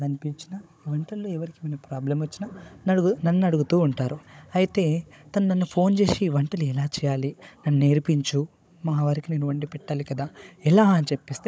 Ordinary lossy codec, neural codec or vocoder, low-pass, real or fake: none; codec, 16 kHz, 16 kbps, FunCodec, trained on Chinese and English, 50 frames a second; none; fake